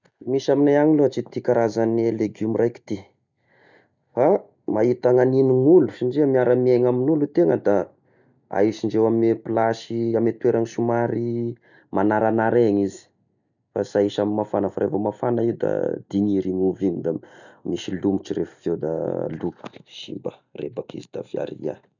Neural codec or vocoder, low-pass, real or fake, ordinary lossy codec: none; 7.2 kHz; real; none